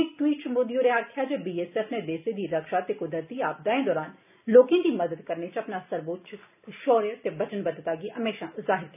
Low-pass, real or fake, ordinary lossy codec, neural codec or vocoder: 3.6 kHz; real; none; none